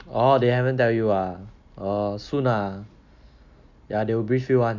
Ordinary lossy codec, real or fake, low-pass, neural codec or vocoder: none; real; 7.2 kHz; none